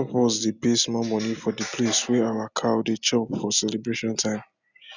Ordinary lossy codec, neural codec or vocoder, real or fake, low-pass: none; none; real; 7.2 kHz